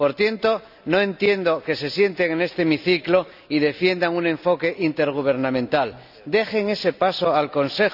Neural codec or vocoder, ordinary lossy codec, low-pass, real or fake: none; none; 5.4 kHz; real